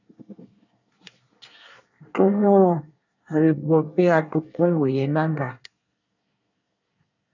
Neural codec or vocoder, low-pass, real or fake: codec, 24 kHz, 1 kbps, SNAC; 7.2 kHz; fake